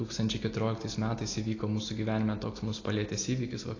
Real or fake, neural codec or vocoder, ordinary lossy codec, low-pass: real; none; AAC, 32 kbps; 7.2 kHz